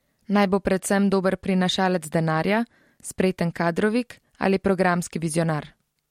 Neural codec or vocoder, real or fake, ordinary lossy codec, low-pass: none; real; MP3, 64 kbps; 19.8 kHz